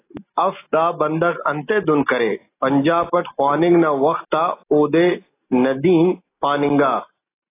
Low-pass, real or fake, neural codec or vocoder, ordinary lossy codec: 3.6 kHz; real; none; AAC, 24 kbps